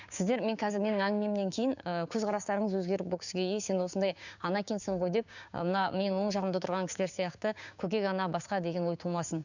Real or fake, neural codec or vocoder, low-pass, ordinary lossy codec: fake; codec, 16 kHz, 6 kbps, DAC; 7.2 kHz; none